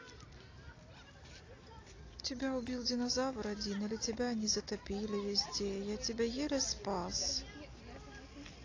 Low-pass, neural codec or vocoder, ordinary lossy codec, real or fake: 7.2 kHz; none; AAC, 32 kbps; real